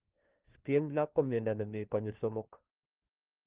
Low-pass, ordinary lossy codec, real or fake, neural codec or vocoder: 3.6 kHz; Opus, 16 kbps; fake; codec, 16 kHz, 1 kbps, FunCodec, trained on LibriTTS, 50 frames a second